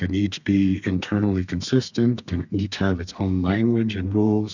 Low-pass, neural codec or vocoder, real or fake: 7.2 kHz; codec, 32 kHz, 1.9 kbps, SNAC; fake